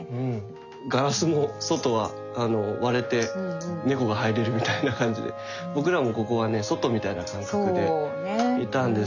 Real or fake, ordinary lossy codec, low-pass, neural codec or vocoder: real; none; 7.2 kHz; none